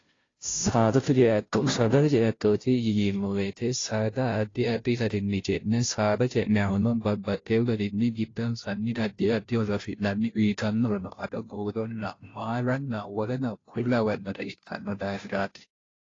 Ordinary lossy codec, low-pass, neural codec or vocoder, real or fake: AAC, 32 kbps; 7.2 kHz; codec, 16 kHz, 0.5 kbps, FunCodec, trained on Chinese and English, 25 frames a second; fake